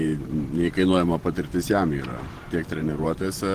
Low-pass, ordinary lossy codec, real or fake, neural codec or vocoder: 14.4 kHz; Opus, 16 kbps; fake; vocoder, 44.1 kHz, 128 mel bands every 512 samples, BigVGAN v2